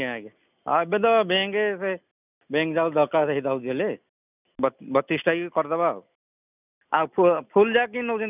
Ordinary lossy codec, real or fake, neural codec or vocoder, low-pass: none; real; none; 3.6 kHz